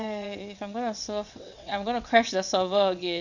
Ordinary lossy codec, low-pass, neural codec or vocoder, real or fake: none; 7.2 kHz; vocoder, 22.05 kHz, 80 mel bands, WaveNeXt; fake